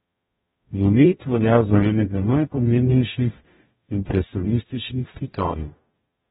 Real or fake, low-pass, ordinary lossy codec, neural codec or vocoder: fake; 19.8 kHz; AAC, 16 kbps; codec, 44.1 kHz, 0.9 kbps, DAC